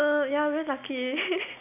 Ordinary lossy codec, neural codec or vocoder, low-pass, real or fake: none; none; 3.6 kHz; real